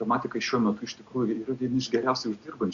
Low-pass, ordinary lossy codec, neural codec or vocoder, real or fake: 7.2 kHz; Opus, 64 kbps; none; real